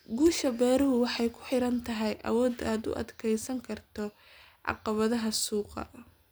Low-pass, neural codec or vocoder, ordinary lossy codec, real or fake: none; none; none; real